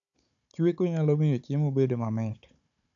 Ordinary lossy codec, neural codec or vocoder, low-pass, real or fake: none; codec, 16 kHz, 16 kbps, FunCodec, trained on Chinese and English, 50 frames a second; 7.2 kHz; fake